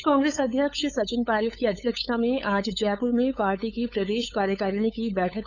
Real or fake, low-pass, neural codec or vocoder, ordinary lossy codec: fake; 7.2 kHz; codec, 16 kHz, 8 kbps, FunCodec, trained on LibriTTS, 25 frames a second; none